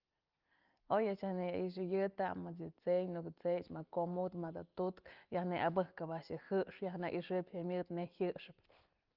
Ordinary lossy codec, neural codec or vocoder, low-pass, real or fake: Opus, 32 kbps; none; 5.4 kHz; real